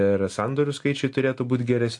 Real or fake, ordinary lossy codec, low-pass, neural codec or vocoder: real; AAC, 64 kbps; 10.8 kHz; none